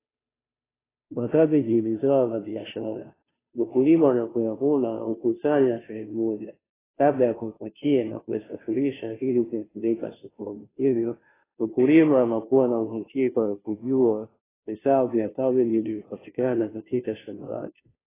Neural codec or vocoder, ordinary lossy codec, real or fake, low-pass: codec, 16 kHz, 0.5 kbps, FunCodec, trained on Chinese and English, 25 frames a second; AAC, 16 kbps; fake; 3.6 kHz